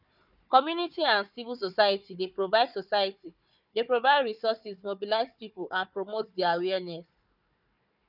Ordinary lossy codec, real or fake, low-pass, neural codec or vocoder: none; fake; 5.4 kHz; codec, 16 kHz, 4 kbps, FunCodec, trained on Chinese and English, 50 frames a second